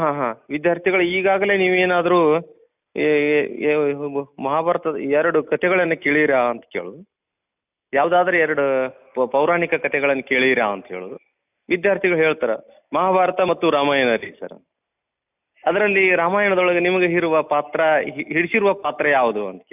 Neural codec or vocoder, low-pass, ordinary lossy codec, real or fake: none; 3.6 kHz; none; real